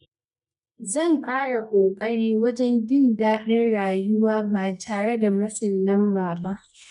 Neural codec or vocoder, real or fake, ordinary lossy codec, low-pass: codec, 24 kHz, 0.9 kbps, WavTokenizer, medium music audio release; fake; none; 10.8 kHz